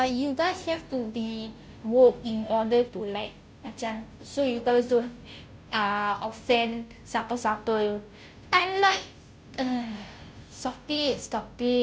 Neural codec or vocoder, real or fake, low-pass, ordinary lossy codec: codec, 16 kHz, 0.5 kbps, FunCodec, trained on Chinese and English, 25 frames a second; fake; none; none